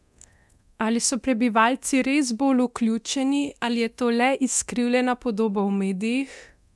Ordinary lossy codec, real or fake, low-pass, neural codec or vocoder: none; fake; none; codec, 24 kHz, 0.9 kbps, DualCodec